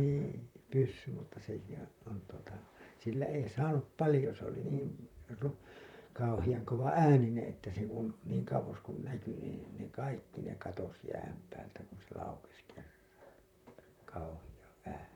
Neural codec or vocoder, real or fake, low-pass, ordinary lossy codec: vocoder, 44.1 kHz, 128 mel bands, Pupu-Vocoder; fake; 19.8 kHz; none